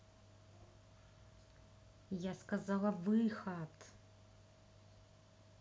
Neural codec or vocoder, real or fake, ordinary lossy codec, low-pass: none; real; none; none